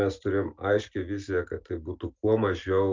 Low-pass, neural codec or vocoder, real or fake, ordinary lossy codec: 7.2 kHz; none; real; Opus, 24 kbps